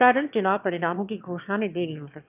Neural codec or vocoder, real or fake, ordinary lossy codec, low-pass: autoencoder, 22.05 kHz, a latent of 192 numbers a frame, VITS, trained on one speaker; fake; none; 3.6 kHz